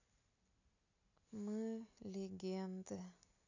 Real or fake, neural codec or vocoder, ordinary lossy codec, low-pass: real; none; AAC, 48 kbps; 7.2 kHz